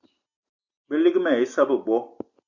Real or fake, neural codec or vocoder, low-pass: real; none; 7.2 kHz